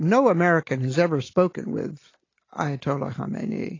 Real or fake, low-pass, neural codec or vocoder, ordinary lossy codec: real; 7.2 kHz; none; AAC, 32 kbps